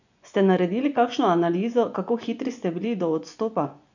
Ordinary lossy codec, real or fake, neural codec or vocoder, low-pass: none; fake; vocoder, 24 kHz, 100 mel bands, Vocos; 7.2 kHz